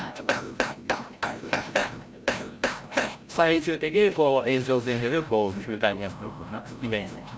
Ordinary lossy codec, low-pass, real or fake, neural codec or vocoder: none; none; fake; codec, 16 kHz, 0.5 kbps, FreqCodec, larger model